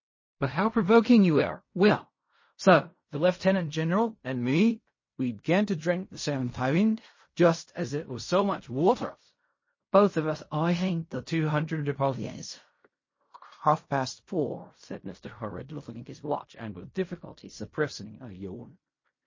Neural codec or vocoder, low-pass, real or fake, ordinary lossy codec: codec, 16 kHz in and 24 kHz out, 0.4 kbps, LongCat-Audio-Codec, fine tuned four codebook decoder; 7.2 kHz; fake; MP3, 32 kbps